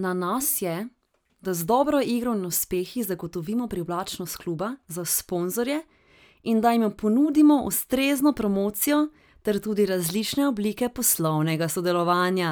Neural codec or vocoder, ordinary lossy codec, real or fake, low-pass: none; none; real; none